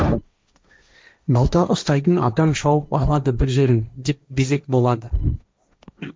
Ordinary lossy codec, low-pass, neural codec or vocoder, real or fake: none; none; codec, 16 kHz, 1.1 kbps, Voila-Tokenizer; fake